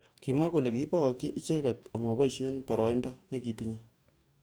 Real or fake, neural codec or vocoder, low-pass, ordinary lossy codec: fake; codec, 44.1 kHz, 2.6 kbps, DAC; none; none